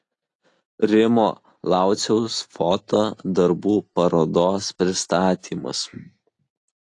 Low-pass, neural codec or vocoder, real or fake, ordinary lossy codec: 10.8 kHz; none; real; AAC, 48 kbps